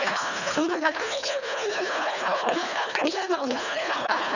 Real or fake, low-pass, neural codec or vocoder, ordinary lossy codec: fake; 7.2 kHz; codec, 24 kHz, 1.5 kbps, HILCodec; none